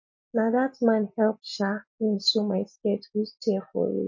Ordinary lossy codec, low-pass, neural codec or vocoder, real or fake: MP3, 32 kbps; 7.2 kHz; none; real